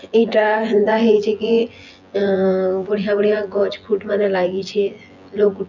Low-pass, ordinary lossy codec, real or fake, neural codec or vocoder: 7.2 kHz; none; fake; vocoder, 24 kHz, 100 mel bands, Vocos